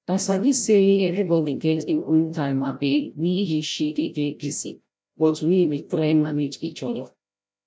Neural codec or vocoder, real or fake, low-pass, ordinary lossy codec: codec, 16 kHz, 0.5 kbps, FreqCodec, larger model; fake; none; none